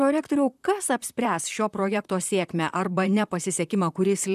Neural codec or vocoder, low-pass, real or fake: vocoder, 44.1 kHz, 128 mel bands, Pupu-Vocoder; 14.4 kHz; fake